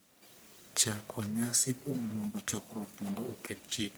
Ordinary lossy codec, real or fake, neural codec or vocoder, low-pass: none; fake; codec, 44.1 kHz, 1.7 kbps, Pupu-Codec; none